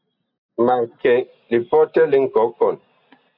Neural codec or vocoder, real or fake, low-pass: none; real; 5.4 kHz